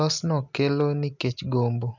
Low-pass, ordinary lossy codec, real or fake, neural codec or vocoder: 7.2 kHz; none; real; none